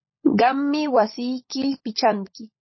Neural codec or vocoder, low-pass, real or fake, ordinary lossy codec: codec, 16 kHz, 16 kbps, FunCodec, trained on LibriTTS, 50 frames a second; 7.2 kHz; fake; MP3, 24 kbps